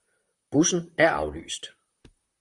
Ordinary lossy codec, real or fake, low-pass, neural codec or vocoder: Opus, 64 kbps; fake; 10.8 kHz; vocoder, 44.1 kHz, 128 mel bands, Pupu-Vocoder